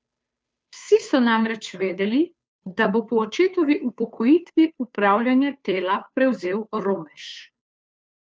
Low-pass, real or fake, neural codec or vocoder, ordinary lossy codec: none; fake; codec, 16 kHz, 2 kbps, FunCodec, trained on Chinese and English, 25 frames a second; none